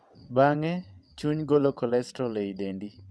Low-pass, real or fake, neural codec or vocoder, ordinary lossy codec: none; fake; vocoder, 22.05 kHz, 80 mel bands, WaveNeXt; none